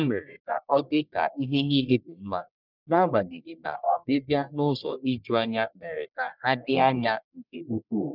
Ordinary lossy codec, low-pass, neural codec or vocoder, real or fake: none; 5.4 kHz; codec, 44.1 kHz, 1.7 kbps, Pupu-Codec; fake